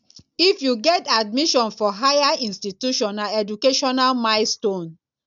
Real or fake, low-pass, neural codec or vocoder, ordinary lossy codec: real; 7.2 kHz; none; none